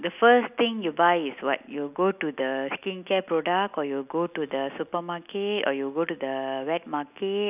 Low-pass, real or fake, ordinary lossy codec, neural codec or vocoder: 3.6 kHz; real; none; none